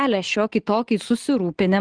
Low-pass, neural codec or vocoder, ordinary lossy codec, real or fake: 9.9 kHz; none; Opus, 16 kbps; real